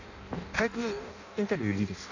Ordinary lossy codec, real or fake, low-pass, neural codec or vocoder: none; fake; 7.2 kHz; codec, 16 kHz in and 24 kHz out, 0.6 kbps, FireRedTTS-2 codec